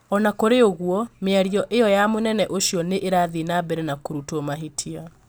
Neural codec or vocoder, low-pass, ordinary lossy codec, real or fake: none; none; none; real